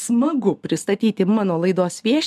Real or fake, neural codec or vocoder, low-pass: real; none; 14.4 kHz